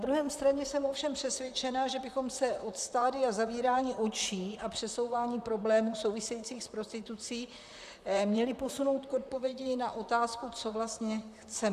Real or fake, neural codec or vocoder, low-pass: fake; vocoder, 44.1 kHz, 128 mel bands, Pupu-Vocoder; 14.4 kHz